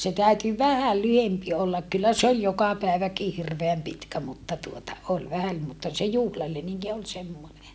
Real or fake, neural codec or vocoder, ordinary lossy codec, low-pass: real; none; none; none